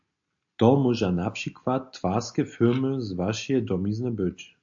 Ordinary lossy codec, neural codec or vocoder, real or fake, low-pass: AAC, 64 kbps; none; real; 7.2 kHz